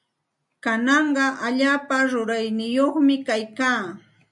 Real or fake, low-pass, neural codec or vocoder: real; 10.8 kHz; none